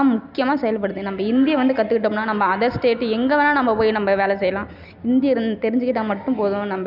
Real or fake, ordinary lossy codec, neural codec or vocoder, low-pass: real; none; none; 5.4 kHz